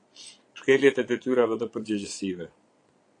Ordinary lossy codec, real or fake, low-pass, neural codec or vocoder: AAC, 48 kbps; fake; 9.9 kHz; vocoder, 22.05 kHz, 80 mel bands, Vocos